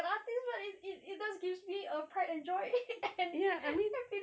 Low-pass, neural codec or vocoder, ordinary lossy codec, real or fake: none; none; none; real